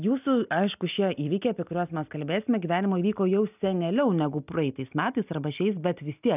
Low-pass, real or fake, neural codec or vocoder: 3.6 kHz; real; none